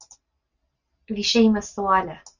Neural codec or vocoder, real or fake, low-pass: none; real; 7.2 kHz